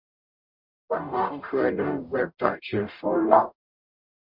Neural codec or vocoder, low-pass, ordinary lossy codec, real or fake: codec, 44.1 kHz, 0.9 kbps, DAC; 5.4 kHz; MP3, 48 kbps; fake